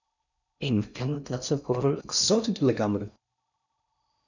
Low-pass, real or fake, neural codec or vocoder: 7.2 kHz; fake; codec, 16 kHz in and 24 kHz out, 0.6 kbps, FocalCodec, streaming, 4096 codes